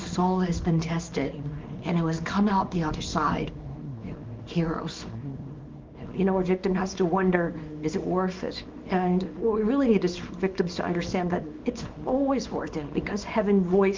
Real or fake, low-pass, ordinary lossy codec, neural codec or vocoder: fake; 7.2 kHz; Opus, 32 kbps; codec, 24 kHz, 0.9 kbps, WavTokenizer, small release